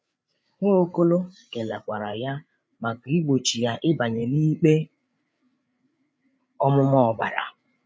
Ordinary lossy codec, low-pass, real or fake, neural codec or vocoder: none; none; fake; codec, 16 kHz, 8 kbps, FreqCodec, larger model